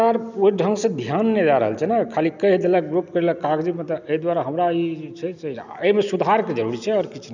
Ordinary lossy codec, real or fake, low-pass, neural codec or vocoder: none; real; 7.2 kHz; none